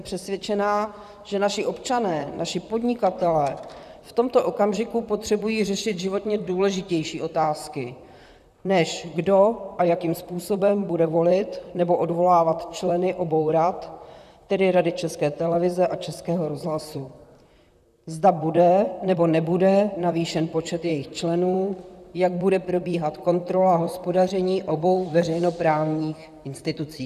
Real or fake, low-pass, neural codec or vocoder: fake; 14.4 kHz; vocoder, 44.1 kHz, 128 mel bands, Pupu-Vocoder